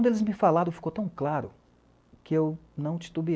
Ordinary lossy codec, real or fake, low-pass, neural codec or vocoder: none; real; none; none